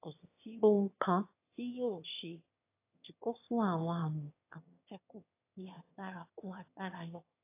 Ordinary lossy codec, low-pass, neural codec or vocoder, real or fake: AAC, 24 kbps; 3.6 kHz; autoencoder, 22.05 kHz, a latent of 192 numbers a frame, VITS, trained on one speaker; fake